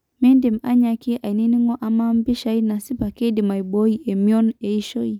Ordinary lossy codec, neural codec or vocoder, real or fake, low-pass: none; none; real; 19.8 kHz